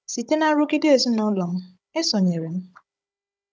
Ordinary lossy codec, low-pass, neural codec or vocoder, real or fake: none; none; codec, 16 kHz, 16 kbps, FunCodec, trained on Chinese and English, 50 frames a second; fake